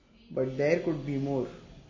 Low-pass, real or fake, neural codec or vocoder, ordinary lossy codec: 7.2 kHz; real; none; MP3, 32 kbps